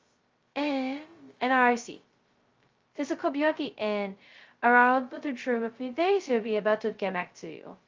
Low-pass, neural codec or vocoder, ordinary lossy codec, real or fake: 7.2 kHz; codec, 16 kHz, 0.2 kbps, FocalCodec; Opus, 32 kbps; fake